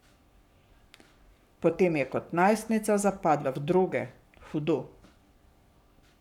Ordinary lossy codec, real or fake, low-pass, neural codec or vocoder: none; fake; 19.8 kHz; codec, 44.1 kHz, 7.8 kbps, Pupu-Codec